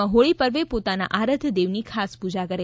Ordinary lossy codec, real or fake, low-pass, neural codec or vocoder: none; real; none; none